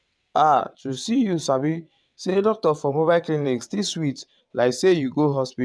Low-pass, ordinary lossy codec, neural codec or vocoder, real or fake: none; none; vocoder, 22.05 kHz, 80 mel bands, WaveNeXt; fake